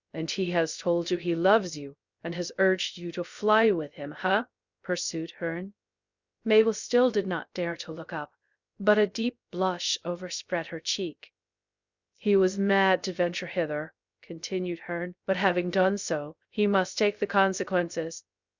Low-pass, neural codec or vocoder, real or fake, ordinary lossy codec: 7.2 kHz; codec, 16 kHz, 0.3 kbps, FocalCodec; fake; Opus, 64 kbps